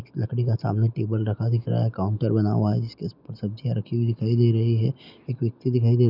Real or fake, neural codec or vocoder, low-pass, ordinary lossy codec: real; none; 5.4 kHz; none